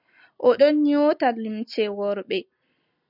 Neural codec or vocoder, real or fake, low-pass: none; real; 5.4 kHz